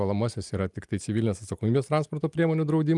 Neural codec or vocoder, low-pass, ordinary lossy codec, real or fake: none; 10.8 kHz; Opus, 32 kbps; real